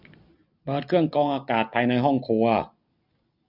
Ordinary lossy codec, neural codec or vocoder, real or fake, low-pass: none; none; real; 5.4 kHz